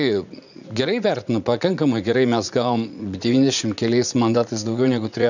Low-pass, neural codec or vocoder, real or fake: 7.2 kHz; vocoder, 44.1 kHz, 80 mel bands, Vocos; fake